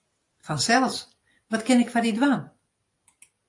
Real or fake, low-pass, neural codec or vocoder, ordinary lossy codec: real; 10.8 kHz; none; AAC, 48 kbps